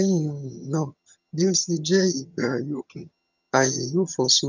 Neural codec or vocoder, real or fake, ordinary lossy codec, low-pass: vocoder, 22.05 kHz, 80 mel bands, HiFi-GAN; fake; none; 7.2 kHz